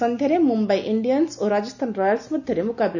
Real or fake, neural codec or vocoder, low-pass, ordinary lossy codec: real; none; 7.2 kHz; none